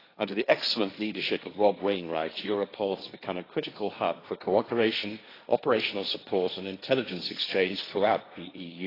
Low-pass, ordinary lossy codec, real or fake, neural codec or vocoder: 5.4 kHz; AAC, 24 kbps; fake; codec, 16 kHz, 1.1 kbps, Voila-Tokenizer